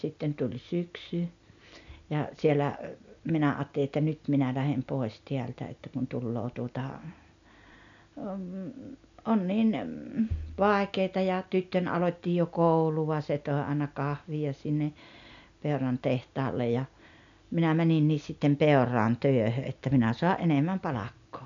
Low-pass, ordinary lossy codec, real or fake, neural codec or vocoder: 7.2 kHz; none; real; none